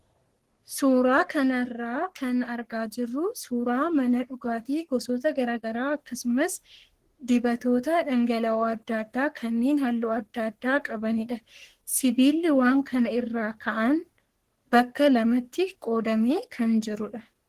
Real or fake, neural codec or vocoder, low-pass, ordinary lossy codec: fake; codec, 44.1 kHz, 3.4 kbps, Pupu-Codec; 14.4 kHz; Opus, 16 kbps